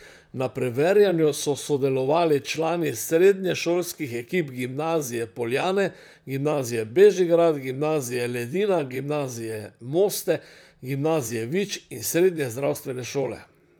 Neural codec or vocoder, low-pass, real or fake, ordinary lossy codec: vocoder, 44.1 kHz, 128 mel bands, Pupu-Vocoder; none; fake; none